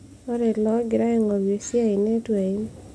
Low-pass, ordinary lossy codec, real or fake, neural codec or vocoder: none; none; real; none